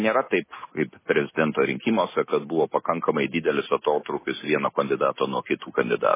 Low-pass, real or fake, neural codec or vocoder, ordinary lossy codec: 3.6 kHz; real; none; MP3, 16 kbps